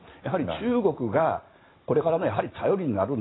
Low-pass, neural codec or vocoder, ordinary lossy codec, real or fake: 7.2 kHz; none; AAC, 16 kbps; real